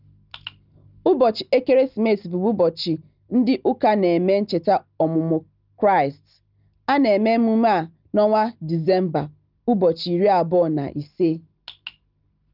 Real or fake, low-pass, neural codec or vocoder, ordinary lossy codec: real; 5.4 kHz; none; Opus, 32 kbps